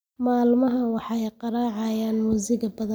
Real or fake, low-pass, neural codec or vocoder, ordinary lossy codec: real; none; none; none